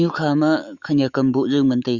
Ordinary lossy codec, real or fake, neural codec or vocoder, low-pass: Opus, 64 kbps; fake; autoencoder, 48 kHz, 128 numbers a frame, DAC-VAE, trained on Japanese speech; 7.2 kHz